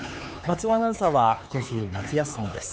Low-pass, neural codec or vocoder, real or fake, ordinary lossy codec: none; codec, 16 kHz, 4 kbps, X-Codec, HuBERT features, trained on LibriSpeech; fake; none